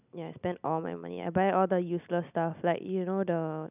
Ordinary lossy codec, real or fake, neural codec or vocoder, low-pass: none; real; none; 3.6 kHz